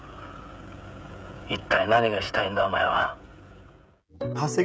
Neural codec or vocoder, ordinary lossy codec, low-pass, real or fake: codec, 16 kHz, 16 kbps, FreqCodec, smaller model; none; none; fake